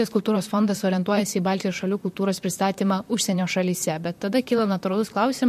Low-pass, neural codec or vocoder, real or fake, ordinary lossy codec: 14.4 kHz; vocoder, 44.1 kHz, 128 mel bands, Pupu-Vocoder; fake; MP3, 64 kbps